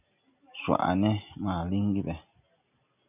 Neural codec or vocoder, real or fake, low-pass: none; real; 3.6 kHz